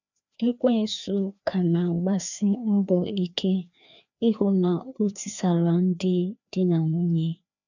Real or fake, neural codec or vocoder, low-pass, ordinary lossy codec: fake; codec, 16 kHz, 2 kbps, FreqCodec, larger model; 7.2 kHz; none